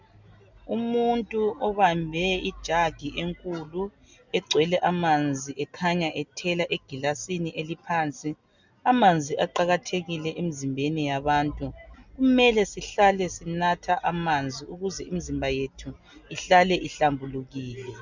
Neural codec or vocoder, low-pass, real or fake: none; 7.2 kHz; real